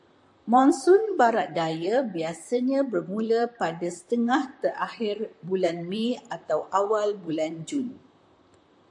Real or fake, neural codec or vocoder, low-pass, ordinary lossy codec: fake; vocoder, 44.1 kHz, 128 mel bands, Pupu-Vocoder; 10.8 kHz; AAC, 64 kbps